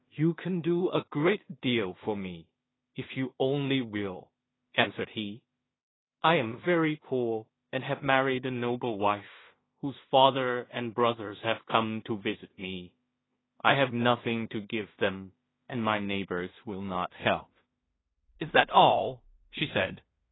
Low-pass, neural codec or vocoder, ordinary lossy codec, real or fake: 7.2 kHz; codec, 16 kHz in and 24 kHz out, 0.4 kbps, LongCat-Audio-Codec, two codebook decoder; AAC, 16 kbps; fake